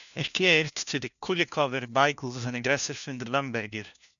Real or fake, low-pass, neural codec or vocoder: fake; 7.2 kHz; codec, 16 kHz, 1 kbps, FunCodec, trained on LibriTTS, 50 frames a second